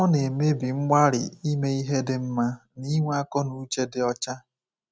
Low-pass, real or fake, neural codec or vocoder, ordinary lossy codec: none; real; none; none